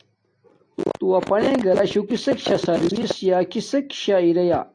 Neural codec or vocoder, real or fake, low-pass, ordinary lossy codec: none; real; 9.9 kHz; AAC, 48 kbps